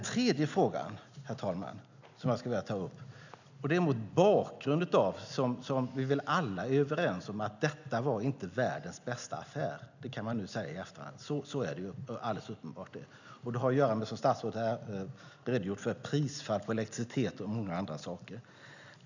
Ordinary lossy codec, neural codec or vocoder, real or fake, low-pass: none; none; real; 7.2 kHz